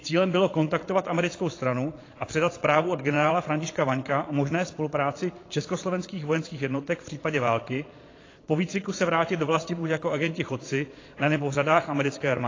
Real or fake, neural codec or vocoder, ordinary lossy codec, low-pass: fake; vocoder, 22.05 kHz, 80 mel bands, Vocos; AAC, 32 kbps; 7.2 kHz